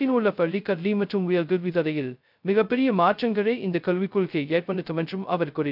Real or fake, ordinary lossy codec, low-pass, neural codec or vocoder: fake; none; 5.4 kHz; codec, 16 kHz, 0.2 kbps, FocalCodec